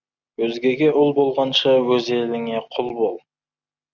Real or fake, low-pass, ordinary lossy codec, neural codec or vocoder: real; 7.2 kHz; Opus, 64 kbps; none